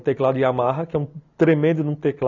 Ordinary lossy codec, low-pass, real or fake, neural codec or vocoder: none; 7.2 kHz; real; none